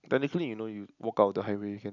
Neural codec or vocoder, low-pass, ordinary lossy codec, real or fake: none; 7.2 kHz; none; real